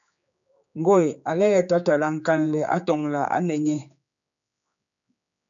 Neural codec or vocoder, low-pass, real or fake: codec, 16 kHz, 4 kbps, X-Codec, HuBERT features, trained on general audio; 7.2 kHz; fake